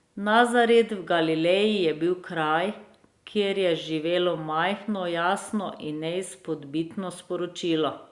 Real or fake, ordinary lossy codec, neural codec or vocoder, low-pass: real; Opus, 64 kbps; none; 10.8 kHz